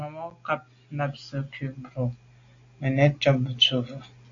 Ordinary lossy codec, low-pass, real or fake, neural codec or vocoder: AAC, 48 kbps; 7.2 kHz; real; none